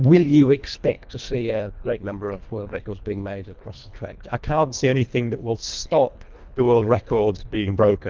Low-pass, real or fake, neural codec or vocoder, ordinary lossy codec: 7.2 kHz; fake; codec, 24 kHz, 1.5 kbps, HILCodec; Opus, 24 kbps